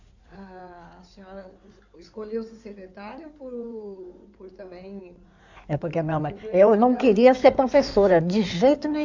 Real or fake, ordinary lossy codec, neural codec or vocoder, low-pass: fake; none; codec, 16 kHz in and 24 kHz out, 2.2 kbps, FireRedTTS-2 codec; 7.2 kHz